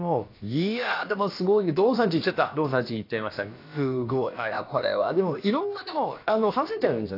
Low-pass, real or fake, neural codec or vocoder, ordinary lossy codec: 5.4 kHz; fake; codec, 16 kHz, about 1 kbps, DyCAST, with the encoder's durations; AAC, 32 kbps